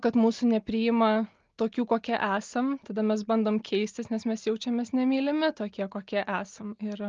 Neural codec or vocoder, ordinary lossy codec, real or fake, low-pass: none; Opus, 32 kbps; real; 7.2 kHz